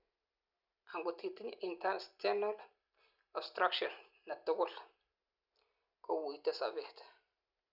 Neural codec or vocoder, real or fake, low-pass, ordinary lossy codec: none; real; 5.4 kHz; Opus, 64 kbps